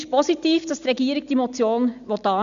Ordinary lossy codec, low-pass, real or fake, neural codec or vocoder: none; 7.2 kHz; real; none